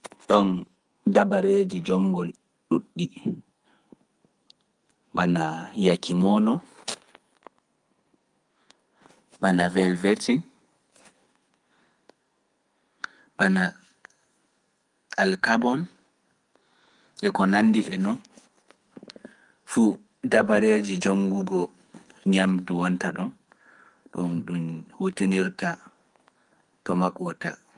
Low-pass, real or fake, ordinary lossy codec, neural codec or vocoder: 10.8 kHz; fake; Opus, 32 kbps; codec, 44.1 kHz, 2.6 kbps, SNAC